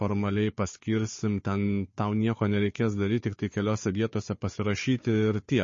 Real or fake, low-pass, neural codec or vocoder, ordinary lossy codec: fake; 7.2 kHz; codec, 16 kHz, 4 kbps, FunCodec, trained on Chinese and English, 50 frames a second; MP3, 32 kbps